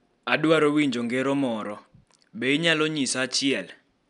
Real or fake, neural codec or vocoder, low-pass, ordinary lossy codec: real; none; 10.8 kHz; none